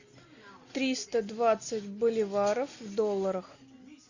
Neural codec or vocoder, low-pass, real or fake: none; 7.2 kHz; real